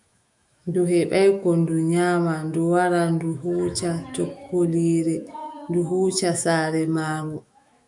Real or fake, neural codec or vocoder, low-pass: fake; autoencoder, 48 kHz, 128 numbers a frame, DAC-VAE, trained on Japanese speech; 10.8 kHz